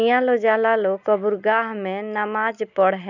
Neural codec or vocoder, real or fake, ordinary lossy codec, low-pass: none; real; none; 7.2 kHz